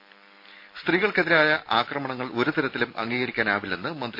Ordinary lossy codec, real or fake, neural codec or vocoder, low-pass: MP3, 32 kbps; real; none; 5.4 kHz